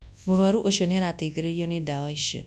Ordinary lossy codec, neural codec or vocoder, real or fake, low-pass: none; codec, 24 kHz, 0.9 kbps, WavTokenizer, large speech release; fake; none